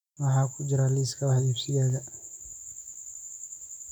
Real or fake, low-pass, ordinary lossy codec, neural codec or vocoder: real; 19.8 kHz; none; none